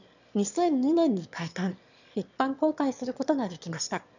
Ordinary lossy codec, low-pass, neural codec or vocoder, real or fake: none; 7.2 kHz; autoencoder, 22.05 kHz, a latent of 192 numbers a frame, VITS, trained on one speaker; fake